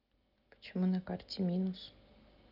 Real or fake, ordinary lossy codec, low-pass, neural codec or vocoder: real; Opus, 24 kbps; 5.4 kHz; none